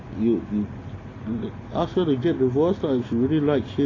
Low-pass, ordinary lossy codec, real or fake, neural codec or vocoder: 7.2 kHz; AAC, 32 kbps; real; none